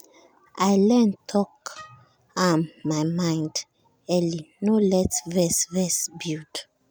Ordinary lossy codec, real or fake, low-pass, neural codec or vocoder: none; real; none; none